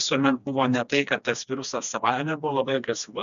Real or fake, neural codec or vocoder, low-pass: fake; codec, 16 kHz, 2 kbps, FreqCodec, smaller model; 7.2 kHz